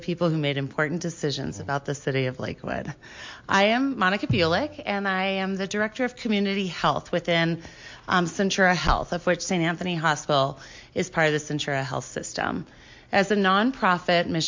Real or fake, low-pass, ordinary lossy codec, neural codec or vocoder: real; 7.2 kHz; MP3, 48 kbps; none